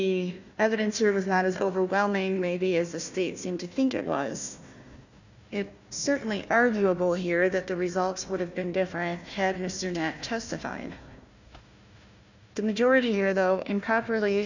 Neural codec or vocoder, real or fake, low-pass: codec, 16 kHz, 1 kbps, FunCodec, trained on Chinese and English, 50 frames a second; fake; 7.2 kHz